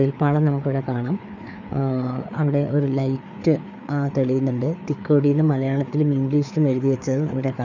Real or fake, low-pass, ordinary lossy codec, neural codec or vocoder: fake; 7.2 kHz; none; codec, 16 kHz, 4 kbps, FreqCodec, larger model